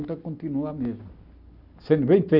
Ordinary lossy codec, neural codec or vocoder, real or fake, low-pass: none; none; real; 5.4 kHz